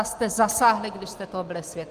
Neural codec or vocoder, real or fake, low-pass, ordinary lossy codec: none; real; 14.4 kHz; Opus, 32 kbps